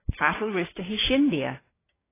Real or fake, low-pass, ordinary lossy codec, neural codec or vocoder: fake; 3.6 kHz; AAC, 16 kbps; codec, 16 kHz, 6 kbps, DAC